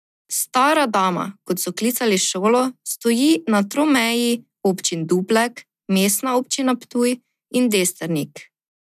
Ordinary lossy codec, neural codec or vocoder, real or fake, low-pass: none; none; real; 14.4 kHz